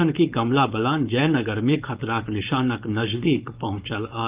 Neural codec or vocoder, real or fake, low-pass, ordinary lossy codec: codec, 16 kHz, 4.8 kbps, FACodec; fake; 3.6 kHz; Opus, 32 kbps